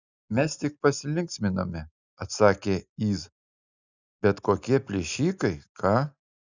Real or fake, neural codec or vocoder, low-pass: real; none; 7.2 kHz